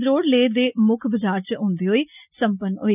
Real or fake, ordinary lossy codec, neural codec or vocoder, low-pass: real; none; none; 3.6 kHz